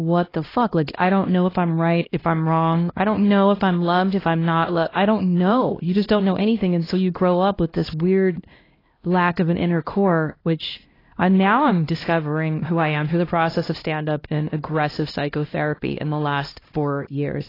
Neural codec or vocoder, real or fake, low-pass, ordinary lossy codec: codec, 16 kHz, 1 kbps, X-Codec, HuBERT features, trained on LibriSpeech; fake; 5.4 kHz; AAC, 24 kbps